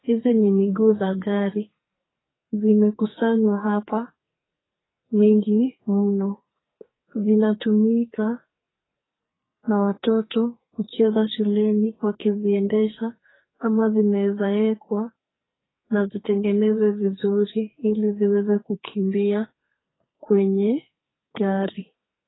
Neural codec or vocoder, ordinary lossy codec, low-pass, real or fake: codec, 44.1 kHz, 2.6 kbps, SNAC; AAC, 16 kbps; 7.2 kHz; fake